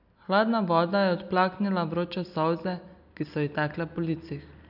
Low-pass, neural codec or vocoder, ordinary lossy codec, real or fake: 5.4 kHz; none; none; real